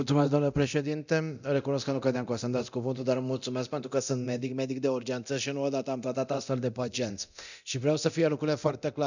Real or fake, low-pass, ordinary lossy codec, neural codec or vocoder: fake; 7.2 kHz; none; codec, 24 kHz, 0.9 kbps, DualCodec